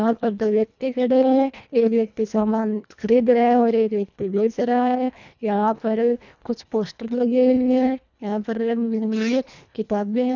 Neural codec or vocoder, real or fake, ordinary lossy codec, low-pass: codec, 24 kHz, 1.5 kbps, HILCodec; fake; none; 7.2 kHz